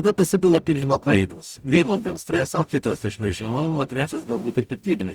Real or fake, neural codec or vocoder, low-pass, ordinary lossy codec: fake; codec, 44.1 kHz, 0.9 kbps, DAC; 19.8 kHz; Opus, 64 kbps